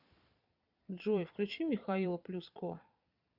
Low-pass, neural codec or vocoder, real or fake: 5.4 kHz; vocoder, 22.05 kHz, 80 mel bands, Vocos; fake